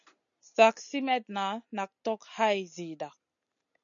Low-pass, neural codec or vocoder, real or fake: 7.2 kHz; none; real